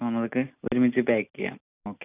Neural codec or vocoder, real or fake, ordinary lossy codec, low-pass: none; real; none; 3.6 kHz